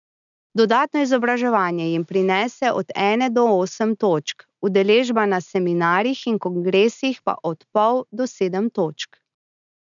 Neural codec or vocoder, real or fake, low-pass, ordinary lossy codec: none; real; 7.2 kHz; none